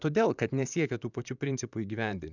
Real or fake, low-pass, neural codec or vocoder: fake; 7.2 kHz; vocoder, 44.1 kHz, 128 mel bands, Pupu-Vocoder